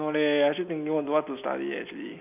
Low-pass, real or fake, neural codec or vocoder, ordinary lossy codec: 3.6 kHz; real; none; none